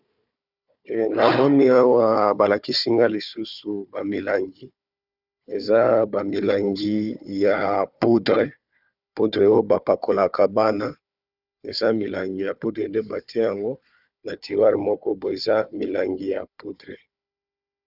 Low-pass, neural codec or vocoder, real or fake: 5.4 kHz; codec, 16 kHz, 4 kbps, FunCodec, trained on Chinese and English, 50 frames a second; fake